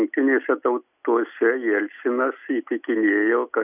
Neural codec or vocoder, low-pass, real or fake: none; 3.6 kHz; real